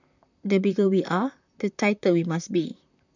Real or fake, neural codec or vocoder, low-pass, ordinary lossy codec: fake; vocoder, 44.1 kHz, 128 mel bands, Pupu-Vocoder; 7.2 kHz; none